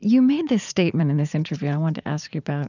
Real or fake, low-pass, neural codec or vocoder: fake; 7.2 kHz; vocoder, 44.1 kHz, 80 mel bands, Vocos